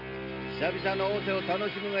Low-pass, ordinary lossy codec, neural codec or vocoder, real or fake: 5.4 kHz; MP3, 48 kbps; none; real